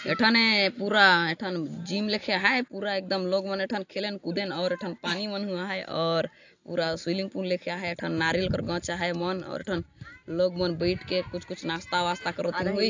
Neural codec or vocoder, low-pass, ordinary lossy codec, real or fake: none; 7.2 kHz; AAC, 48 kbps; real